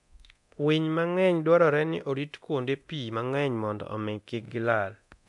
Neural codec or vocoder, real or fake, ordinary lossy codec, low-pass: codec, 24 kHz, 0.9 kbps, DualCodec; fake; none; 10.8 kHz